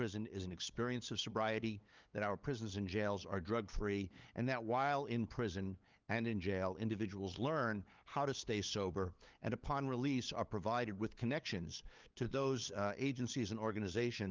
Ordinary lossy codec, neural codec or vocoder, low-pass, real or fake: Opus, 24 kbps; codec, 16 kHz, 8 kbps, FunCodec, trained on LibriTTS, 25 frames a second; 7.2 kHz; fake